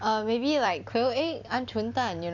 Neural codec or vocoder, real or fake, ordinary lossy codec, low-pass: vocoder, 44.1 kHz, 128 mel bands every 256 samples, BigVGAN v2; fake; none; 7.2 kHz